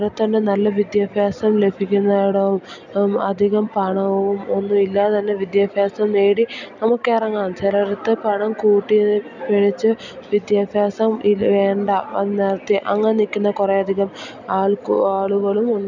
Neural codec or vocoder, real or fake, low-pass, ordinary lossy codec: none; real; 7.2 kHz; none